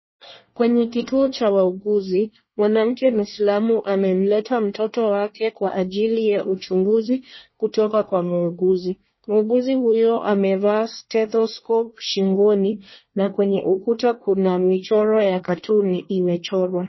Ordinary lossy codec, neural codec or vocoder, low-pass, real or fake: MP3, 24 kbps; codec, 24 kHz, 1 kbps, SNAC; 7.2 kHz; fake